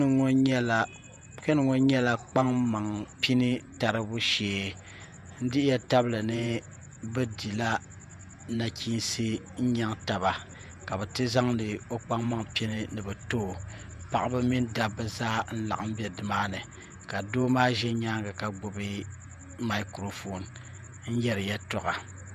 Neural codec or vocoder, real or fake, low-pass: vocoder, 48 kHz, 128 mel bands, Vocos; fake; 14.4 kHz